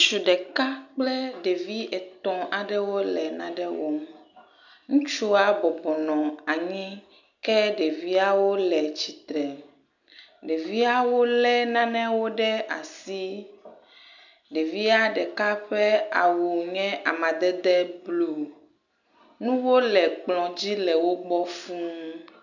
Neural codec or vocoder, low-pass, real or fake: none; 7.2 kHz; real